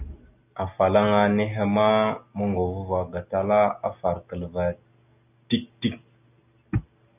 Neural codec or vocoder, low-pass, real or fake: none; 3.6 kHz; real